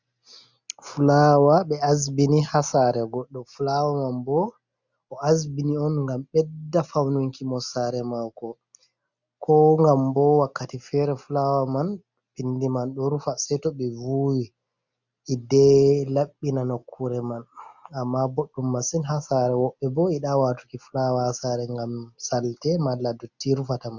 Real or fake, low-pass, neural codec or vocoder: real; 7.2 kHz; none